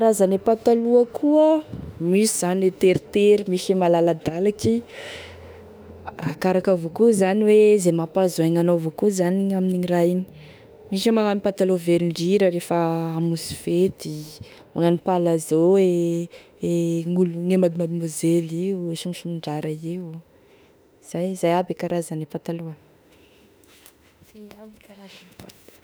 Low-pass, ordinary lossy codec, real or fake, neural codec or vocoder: none; none; fake; autoencoder, 48 kHz, 32 numbers a frame, DAC-VAE, trained on Japanese speech